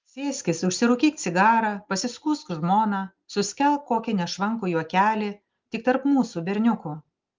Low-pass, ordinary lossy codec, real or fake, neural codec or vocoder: 7.2 kHz; Opus, 24 kbps; real; none